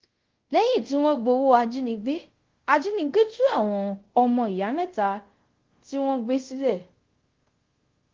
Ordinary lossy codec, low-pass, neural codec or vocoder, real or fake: Opus, 16 kbps; 7.2 kHz; codec, 24 kHz, 0.5 kbps, DualCodec; fake